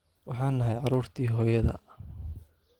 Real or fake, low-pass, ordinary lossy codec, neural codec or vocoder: fake; 19.8 kHz; Opus, 16 kbps; vocoder, 44.1 kHz, 128 mel bands every 512 samples, BigVGAN v2